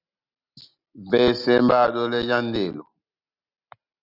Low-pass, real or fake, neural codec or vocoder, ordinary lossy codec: 5.4 kHz; real; none; Opus, 64 kbps